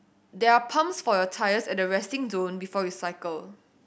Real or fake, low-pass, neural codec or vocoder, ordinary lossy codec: real; none; none; none